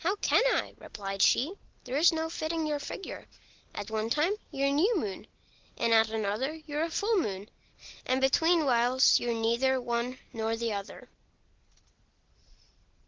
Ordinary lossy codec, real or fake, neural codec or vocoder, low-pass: Opus, 16 kbps; real; none; 7.2 kHz